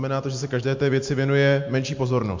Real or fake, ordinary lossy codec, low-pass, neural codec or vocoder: real; MP3, 48 kbps; 7.2 kHz; none